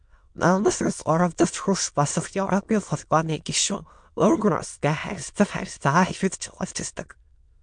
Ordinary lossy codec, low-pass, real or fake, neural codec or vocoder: MP3, 64 kbps; 9.9 kHz; fake; autoencoder, 22.05 kHz, a latent of 192 numbers a frame, VITS, trained on many speakers